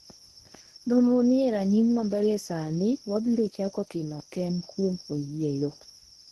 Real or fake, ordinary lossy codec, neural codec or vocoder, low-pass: fake; Opus, 16 kbps; codec, 24 kHz, 0.9 kbps, WavTokenizer, medium speech release version 1; 10.8 kHz